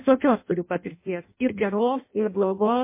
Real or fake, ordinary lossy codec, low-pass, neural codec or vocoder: fake; MP3, 24 kbps; 3.6 kHz; codec, 16 kHz in and 24 kHz out, 0.6 kbps, FireRedTTS-2 codec